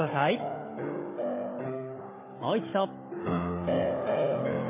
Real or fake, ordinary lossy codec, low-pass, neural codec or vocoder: fake; MP3, 16 kbps; 3.6 kHz; codec, 16 kHz, 16 kbps, FunCodec, trained on Chinese and English, 50 frames a second